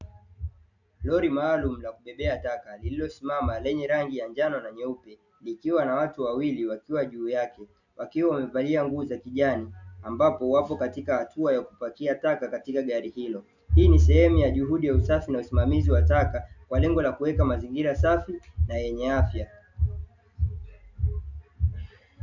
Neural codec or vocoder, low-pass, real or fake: none; 7.2 kHz; real